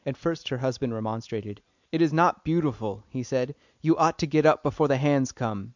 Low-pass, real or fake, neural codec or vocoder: 7.2 kHz; real; none